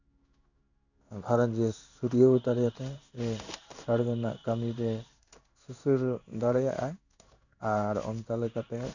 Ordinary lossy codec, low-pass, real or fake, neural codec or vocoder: none; 7.2 kHz; fake; codec, 16 kHz in and 24 kHz out, 1 kbps, XY-Tokenizer